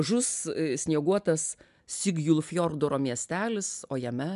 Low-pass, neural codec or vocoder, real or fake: 10.8 kHz; none; real